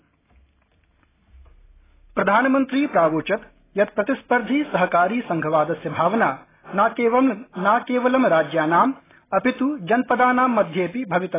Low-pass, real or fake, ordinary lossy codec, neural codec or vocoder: 3.6 kHz; real; AAC, 16 kbps; none